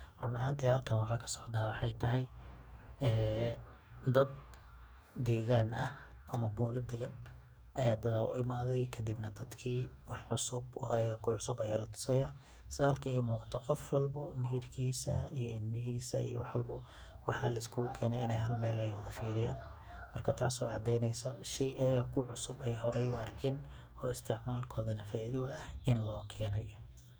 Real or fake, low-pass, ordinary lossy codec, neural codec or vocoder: fake; none; none; codec, 44.1 kHz, 2.6 kbps, DAC